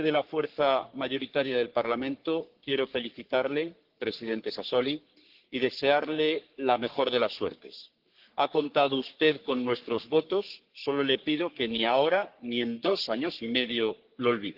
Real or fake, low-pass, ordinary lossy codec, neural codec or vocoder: fake; 5.4 kHz; Opus, 16 kbps; codec, 44.1 kHz, 3.4 kbps, Pupu-Codec